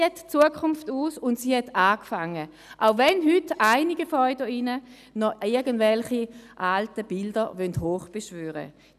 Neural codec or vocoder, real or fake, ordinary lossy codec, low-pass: none; real; none; 14.4 kHz